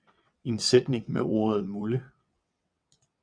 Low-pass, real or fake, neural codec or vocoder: 9.9 kHz; fake; vocoder, 22.05 kHz, 80 mel bands, WaveNeXt